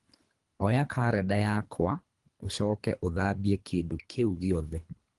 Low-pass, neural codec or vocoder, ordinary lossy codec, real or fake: 10.8 kHz; codec, 24 kHz, 3 kbps, HILCodec; Opus, 24 kbps; fake